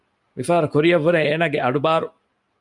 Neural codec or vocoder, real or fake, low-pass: none; real; 10.8 kHz